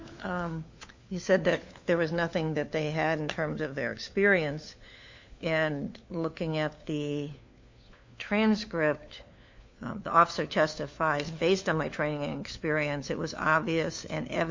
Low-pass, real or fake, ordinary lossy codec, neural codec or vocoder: 7.2 kHz; fake; MP3, 48 kbps; codec, 16 kHz, 4 kbps, FunCodec, trained on LibriTTS, 50 frames a second